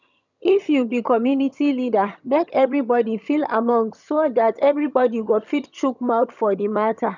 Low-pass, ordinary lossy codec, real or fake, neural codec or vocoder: 7.2 kHz; none; fake; vocoder, 22.05 kHz, 80 mel bands, HiFi-GAN